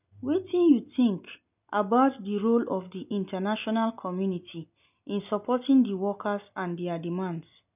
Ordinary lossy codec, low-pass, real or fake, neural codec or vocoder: none; 3.6 kHz; real; none